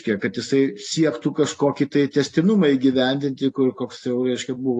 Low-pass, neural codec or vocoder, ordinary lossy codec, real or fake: 10.8 kHz; none; AAC, 48 kbps; real